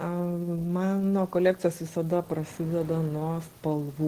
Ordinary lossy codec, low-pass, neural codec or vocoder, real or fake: Opus, 16 kbps; 14.4 kHz; none; real